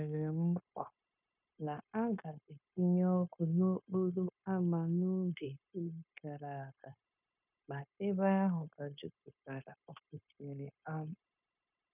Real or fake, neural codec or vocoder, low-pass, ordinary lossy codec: fake; codec, 16 kHz, 0.9 kbps, LongCat-Audio-Codec; 3.6 kHz; none